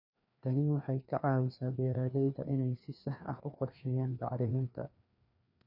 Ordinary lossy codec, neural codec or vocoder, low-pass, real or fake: none; codec, 16 kHz, 2 kbps, FreqCodec, larger model; 5.4 kHz; fake